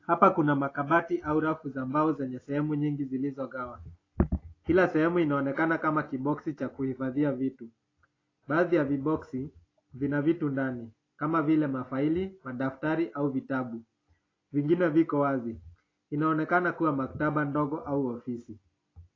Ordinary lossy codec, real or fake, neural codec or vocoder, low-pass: AAC, 32 kbps; real; none; 7.2 kHz